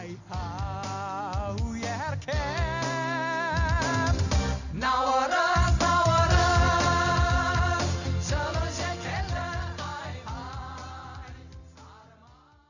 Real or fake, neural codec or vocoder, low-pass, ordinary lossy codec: real; none; 7.2 kHz; none